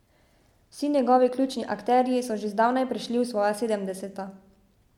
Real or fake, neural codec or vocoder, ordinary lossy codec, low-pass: real; none; MP3, 96 kbps; 19.8 kHz